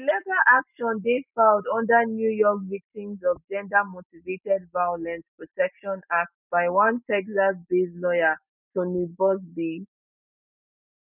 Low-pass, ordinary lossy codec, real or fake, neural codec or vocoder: 3.6 kHz; none; real; none